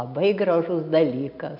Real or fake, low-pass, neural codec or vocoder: real; 5.4 kHz; none